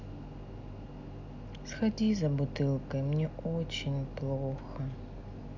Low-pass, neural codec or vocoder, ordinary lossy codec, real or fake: 7.2 kHz; none; none; real